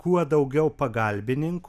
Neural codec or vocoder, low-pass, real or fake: none; 14.4 kHz; real